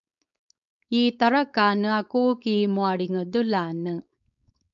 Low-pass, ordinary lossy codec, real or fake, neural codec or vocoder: 7.2 kHz; MP3, 96 kbps; fake; codec, 16 kHz, 4.8 kbps, FACodec